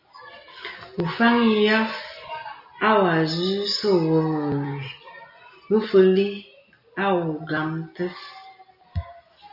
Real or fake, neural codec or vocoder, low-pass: real; none; 5.4 kHz